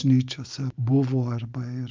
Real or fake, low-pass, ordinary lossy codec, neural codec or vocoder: real; 7.2 kHz; Opus, 24 kbps; none